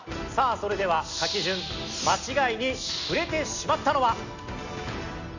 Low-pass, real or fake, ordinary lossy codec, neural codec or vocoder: 7.2 kHz; real; none; none